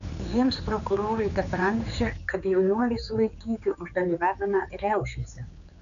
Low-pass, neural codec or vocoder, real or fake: 7.2 kHz; codec, 16 kHz, 4 kbps, X-Codec, HuBERT features, trained on general audio; fake